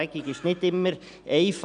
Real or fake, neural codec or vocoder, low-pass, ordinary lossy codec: real; none; 9.9 kHz; none